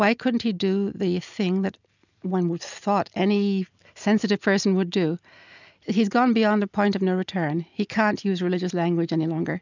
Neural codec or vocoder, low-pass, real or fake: none; 7.2 kHz; real